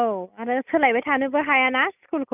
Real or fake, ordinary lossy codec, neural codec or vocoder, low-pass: real; none; none; 3.6 kHz